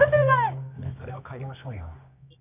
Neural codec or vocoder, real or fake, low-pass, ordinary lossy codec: codec, 24 kHz, 0.9 kbps, WavTokenizer, medium music audio release; fake; 3.6 kHz; none